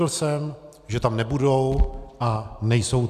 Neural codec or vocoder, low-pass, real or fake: none; 14.4 kHz; real